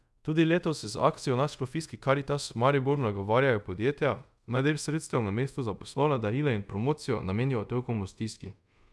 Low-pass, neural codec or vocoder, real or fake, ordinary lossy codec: none; codec, 24 kHz, 0.5 kbps, DualCodec; fake; none